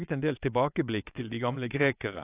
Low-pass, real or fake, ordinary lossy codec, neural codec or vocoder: 3.6 kHz; fake; AAC, 32 kbps; vocoder, 22.05 kHz, 80 mel bands, WaveNeXt